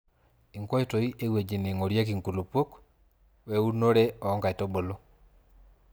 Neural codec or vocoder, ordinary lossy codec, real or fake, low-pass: none; none; real; none